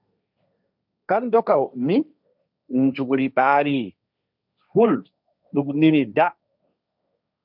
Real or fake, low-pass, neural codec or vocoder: fake; 5.4 kHz; codec, 16 kHz, 1.1 kbps, Voila-Tokenizer